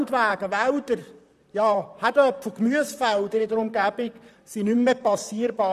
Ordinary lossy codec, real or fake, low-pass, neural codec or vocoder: none; fake; 14.4 kHz; vocoder, 44.1 kHz, 128 mel bands, Pupu-Vocoder